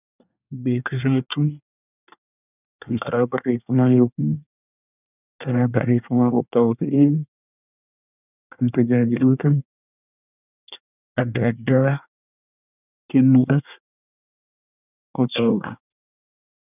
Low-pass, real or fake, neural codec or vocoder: 3.6 kHz; fake; codec, 24 kHz, 1 kbps, SNAC